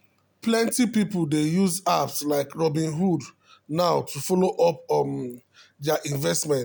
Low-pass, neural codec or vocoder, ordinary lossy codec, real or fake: none; none; none; real